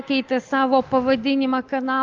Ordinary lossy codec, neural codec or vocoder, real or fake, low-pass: Opus, 24 kbps; none; real; 7.2 kHz